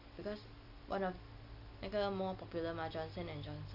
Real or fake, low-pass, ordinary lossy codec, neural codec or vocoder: real; 5.4 kHz; none; none